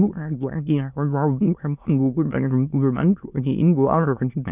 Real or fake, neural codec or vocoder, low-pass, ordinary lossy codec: fake; autoencoder, 22.05 kHz, a latent of 192 numbers a frame, VITS, trained on many speakers; 3.6 kHz; none